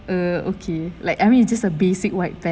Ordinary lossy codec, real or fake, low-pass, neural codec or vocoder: none; real; none; none